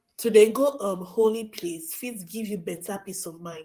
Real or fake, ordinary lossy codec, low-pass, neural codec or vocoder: fake; Opus, 32 kbps; 14.4 kHz; vocoder, 44.1 kHz, 128 mel bands, Pupu-Vocoder